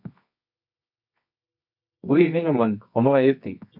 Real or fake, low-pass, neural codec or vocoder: fake; 5.4 kHz; codec, 24 kHz, 0.9 kbps, WavTokenizer, medium music audio release